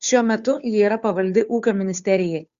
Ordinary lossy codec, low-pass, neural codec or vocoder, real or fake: Opus, 64 kbps; 7.2 kHz; codec, 16 kHz, 2 kbps, FunCodec, trained on Chinese and English, 25 frames a second; fake